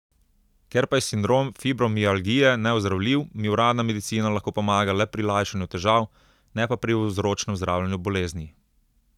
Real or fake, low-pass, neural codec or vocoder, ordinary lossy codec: real; 19.8 kHz; none; none